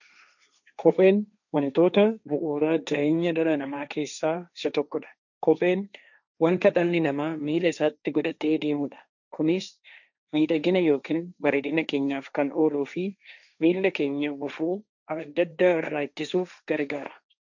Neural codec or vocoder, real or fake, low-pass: codec, 16 kHz, 1.1 kbps, Voila-Tokenizer; fake; 7.2 kHz